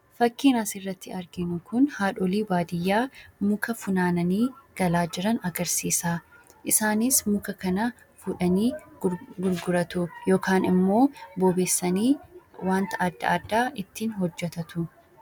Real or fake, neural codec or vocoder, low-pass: real; none; 19.8 kHz